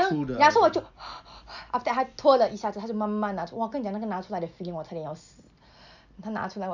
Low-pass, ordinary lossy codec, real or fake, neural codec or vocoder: 7.2 kHz; none; real; none